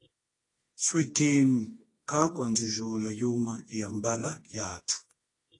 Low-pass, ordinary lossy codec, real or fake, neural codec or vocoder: 10.8 kHz; AAC, 32 kbps; fake; codec, 24 kHz, 0.9 kbps, WavTokenizer, medium music audio release